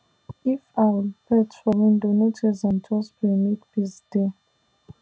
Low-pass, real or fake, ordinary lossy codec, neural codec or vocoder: none; real; none; none